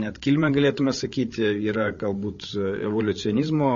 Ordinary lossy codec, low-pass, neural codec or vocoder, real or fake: MP3, 32 kbps; 7.2 kHz; codec, 16 kHz, 8 kbps, FreqCodec, larger model; fake